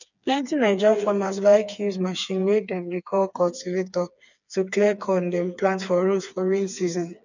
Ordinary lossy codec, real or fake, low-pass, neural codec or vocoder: none; fake; 7.2 kHz; codec, 16 kHz, 4 kbps, FreqCodec, smaller model